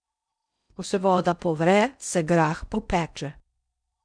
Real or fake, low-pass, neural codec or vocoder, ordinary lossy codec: fake; 9.9 kHz; codec, 16 kHz in and 24 kHz out, 0.6 kbps, FocalCodec, streaming, 2048 codes; none